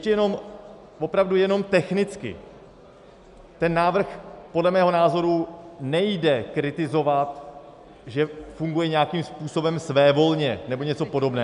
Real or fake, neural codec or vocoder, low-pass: real; none; 10.8 kHz